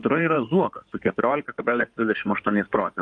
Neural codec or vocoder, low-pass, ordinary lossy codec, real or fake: codec, 16 kHz in and 24 kHz out, 2.2 kbps, FireRedTTS-2 codec; 9.9 kHz; MP3, 96 kbps; fake